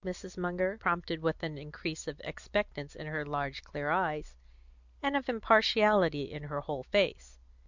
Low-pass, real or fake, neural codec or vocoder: 7.2 kHz; real; none